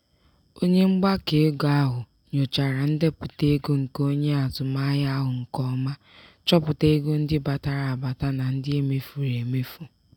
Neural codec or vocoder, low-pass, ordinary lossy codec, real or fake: none; 19.8 kHz; none; real